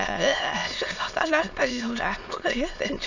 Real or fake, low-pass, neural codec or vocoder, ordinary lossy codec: fake; 7.2 kHz; autoencoder, 22.05 kHz, a latent of 192 numbers a frame, VITS, trained on many speakers; none